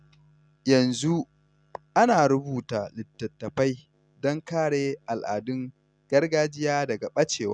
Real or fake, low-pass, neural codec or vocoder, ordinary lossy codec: real; 9.9 kHz; none; none